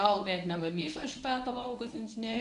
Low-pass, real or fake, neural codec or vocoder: 10.8 kHz; fake; codec, 24 kHz, 0.9 kbps, WavTokenizer, medium speech release version 1